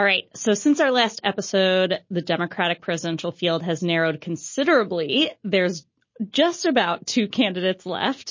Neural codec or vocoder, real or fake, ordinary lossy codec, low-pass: none; real; MP3, 32 kbps; 7.2 kHz